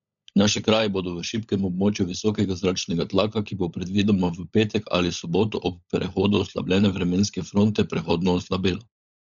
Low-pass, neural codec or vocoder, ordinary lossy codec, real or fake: 7.2 kHz; codec, 16 kHz, 16 kbps, FunCodec, trained on LibriTTS, 50 frames a second; none; fake